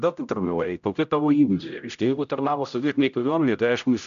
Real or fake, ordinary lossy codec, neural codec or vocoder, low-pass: fake; MP3, 96 kbps; codec, 16 kHz, 0.5 kbps, X-Codec, HuBERT features, trained on general audio; 7.2 kHz